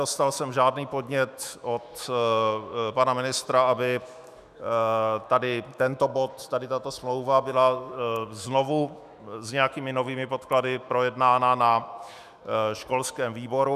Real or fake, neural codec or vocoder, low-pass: fake; autoencoder, 48 kHz, 128 numbers a frame, DAC-VAE, trained on Japanese speech; 14.4 kHz